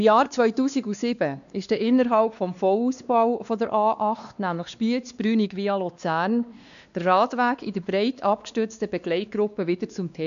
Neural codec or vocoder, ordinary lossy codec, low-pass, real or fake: codec, 16 kHz, 2 kbps, X-Codec, WavLM features, trained on Multilingual LibriSpeech; none; 7.2 kHz; fake